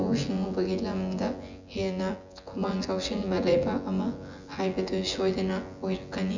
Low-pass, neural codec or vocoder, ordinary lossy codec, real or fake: 7.2 kHz; vocoder, 24 kHz, 100 mel bands, Vocos; Opus, 64 kbps; fake